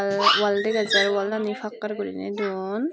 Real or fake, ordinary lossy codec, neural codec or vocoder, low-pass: real; none; none; none